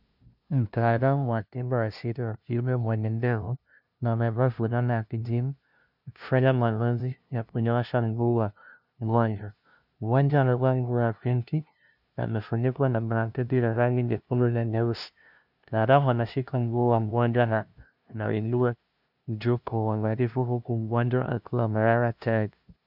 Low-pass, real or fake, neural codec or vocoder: 5.4 kHz; fake; codec, 16 kHz, 0.5 kbps, FunCodec, trained on LibriTTS, 25 frames a second